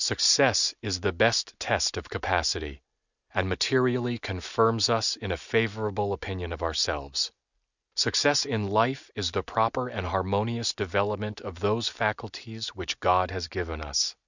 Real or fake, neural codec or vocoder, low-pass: real; none; 7.2 kHz